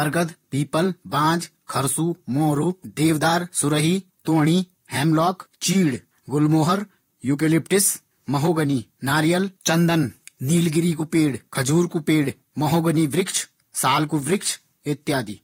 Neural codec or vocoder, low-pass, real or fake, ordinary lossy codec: vocoder, 44.1 kHz, 128 mel bands, Pupu-Vocoder; 19.8 kHz; fake; AAC, 48 kbps